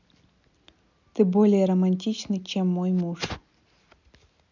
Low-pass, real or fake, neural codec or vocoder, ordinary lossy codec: 7.2 kHz; real; none; none